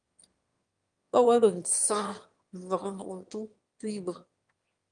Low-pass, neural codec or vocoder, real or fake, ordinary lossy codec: 9.9 kHz; autoencoder, 22.05 kHz, a latent of 192 numbers a frame, VITS, trained on one speaker; fake; Opus, 32 kbps